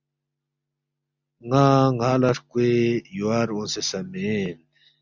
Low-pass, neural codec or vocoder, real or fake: 7.2 kHz; none; real